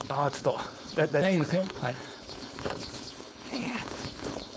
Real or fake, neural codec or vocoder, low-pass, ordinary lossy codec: fake; codec, 16 kHz, 4.8 kbps, FACodec; none; none